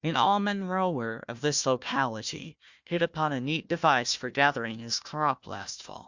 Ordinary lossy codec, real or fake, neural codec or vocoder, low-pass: Opus, 64 kbps; fake; codec, 16 kHz, 1 kbps, FunCodec, trained on Chinese and English, 50 frames a second; 7.2 kHz